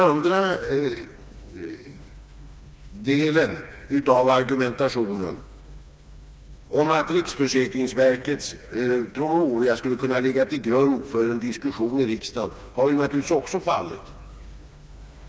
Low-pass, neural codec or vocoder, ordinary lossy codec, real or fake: none; codec, 16 kHz, 2 kbps, FreqCodec, smaller model; none; fake